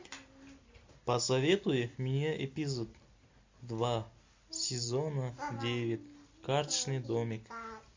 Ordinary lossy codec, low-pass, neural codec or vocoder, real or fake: MP3, 48 kbps; 7.2 kHz; none; real